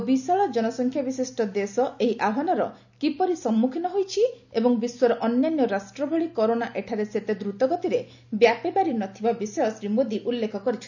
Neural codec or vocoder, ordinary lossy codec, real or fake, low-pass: none; none; real; 7.2 kHz